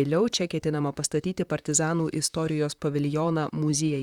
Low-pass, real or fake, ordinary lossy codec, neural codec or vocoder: 19.8 kHz; fake; Opus, 64 kbps; vocoder, 44.1 kHz, 128 mel bands, Pupu-Vocoder